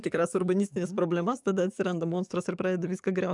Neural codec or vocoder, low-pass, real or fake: codec, 44.1 kHz, 7.8 kbps, DAC; 10.8 kHz; fake